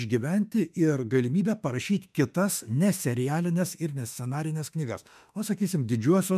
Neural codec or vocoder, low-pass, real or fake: autoencoder, 48 kHz, 32 numbers a frame, DAC-VAE, trained on Japanese speech; 14.4 kHz; fake